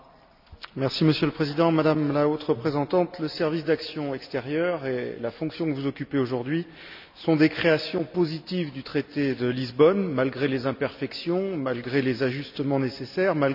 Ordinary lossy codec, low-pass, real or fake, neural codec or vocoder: none; 5.4 kHz; real; none